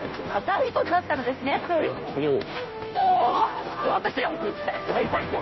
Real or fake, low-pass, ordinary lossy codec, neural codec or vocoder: fake; 7.2 kHz; MP3, 24 kbps; codec, 16 kHz, 0.5 kbps, FunCodec, trained on Chinese and English, 25 frames a second